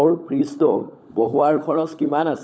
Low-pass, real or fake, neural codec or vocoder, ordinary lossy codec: none; fake; codec, 16 kHz, 16 kbps, FunCodec, trained on LibriTTS, 50 frames a second; none